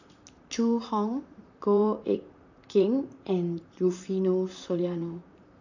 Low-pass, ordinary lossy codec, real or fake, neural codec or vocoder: 7.2 kHz; none; fake; vocoder, 44.1 kHz, 128 mel bands, Pupu-Vocoder